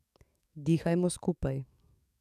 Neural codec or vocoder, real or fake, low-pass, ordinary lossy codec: codec, 44.1 kHz, 7.8 kbps, DAC; fake; 14.4 kHz; none